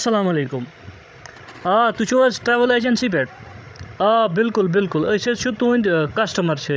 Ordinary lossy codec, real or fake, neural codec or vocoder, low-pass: none; fake; codec, 16 kHz, 16 kbps, FreqCodec, larger model; none